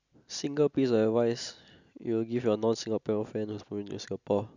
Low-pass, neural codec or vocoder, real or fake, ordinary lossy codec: 7.2 kHz; none; real; none